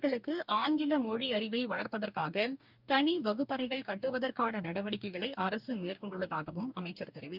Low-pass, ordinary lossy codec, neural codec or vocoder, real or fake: 5.4 kHz; none; codec, 44.1 kHz, 2.6 kbps, DAC; fake